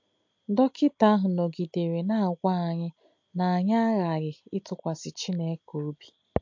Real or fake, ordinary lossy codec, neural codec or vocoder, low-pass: real; MP3, 48 kbps; none; 7.2 kHz